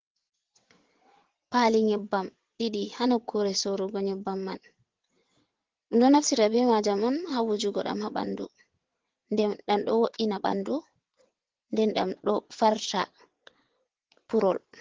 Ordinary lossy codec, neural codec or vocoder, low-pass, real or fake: Opus, 16 kbps; vocoder, 22.05 kHz, 80 mel bands, WaveNeXt; 7.2 kHz; fake